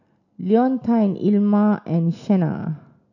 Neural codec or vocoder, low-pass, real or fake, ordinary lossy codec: none; 7.2 kHz; real; none